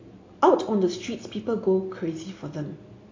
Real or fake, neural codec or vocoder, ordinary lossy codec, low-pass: real; none; AAC, 32 kbps; 7.2 kHz